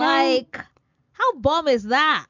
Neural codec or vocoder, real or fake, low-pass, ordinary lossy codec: none; real; 7.2 kHz; MP3, 64 kbps